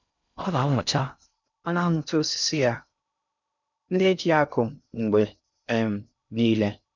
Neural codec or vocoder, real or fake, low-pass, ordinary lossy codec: codec, 16 kHz in and 24 kHz out, 0.6 kbps, FocalCodec, streaming, 2048 codes; fake; 7.2 kHz; none